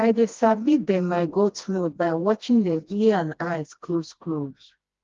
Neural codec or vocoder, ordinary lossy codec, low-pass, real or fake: codec, 16 kHz, 1 kbps, FreqCodec, smaller model; Opus, 32 kbps; 7.2 kHz; fake